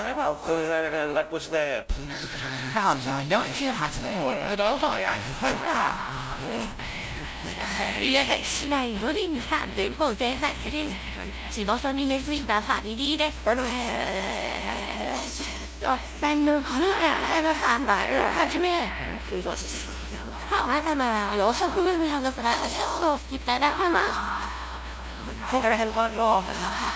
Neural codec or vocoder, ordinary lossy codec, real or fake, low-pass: codec, 16 kHz, 0.5 kbps, FunCodec, trained on LibriTTS, 25 frames a second; none; fake; none